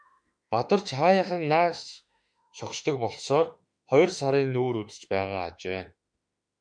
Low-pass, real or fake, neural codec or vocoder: 9.9 kHz; fake; autoencoder, 48 kHz, 32 numbers a frame, DAC-VAE, trained on Japanese speech